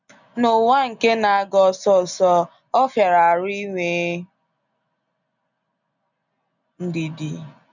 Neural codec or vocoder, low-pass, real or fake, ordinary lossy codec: none; 7.2 kHz; real; none